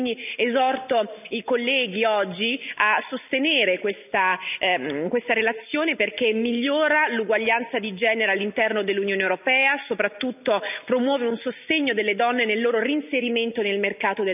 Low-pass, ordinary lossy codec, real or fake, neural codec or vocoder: 3.6 kHz; none; real; none